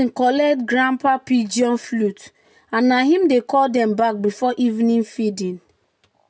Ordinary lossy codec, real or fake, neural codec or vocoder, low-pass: none; real; none; none